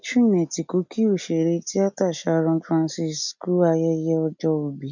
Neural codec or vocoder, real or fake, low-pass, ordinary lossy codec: none; real; 7.2 kHz; none